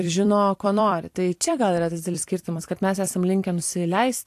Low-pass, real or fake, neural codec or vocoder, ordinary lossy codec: 14.4 kHz; fake; vocoder, 44.1 kHz, 128 mel bands every 256 samples, BigVGAN v2; AAC, 64 kbps